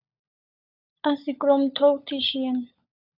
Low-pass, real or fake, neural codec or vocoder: 5.4 kHz; fake; codec, 16 kHz, 16 kbps, FunCodec, trained on LibriTTS, 50 frames a second